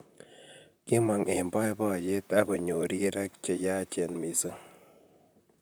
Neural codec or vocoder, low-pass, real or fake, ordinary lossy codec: vocoder, 44.1 kHz, 128 mel bands, Pupu-Vocoder; none; fake; none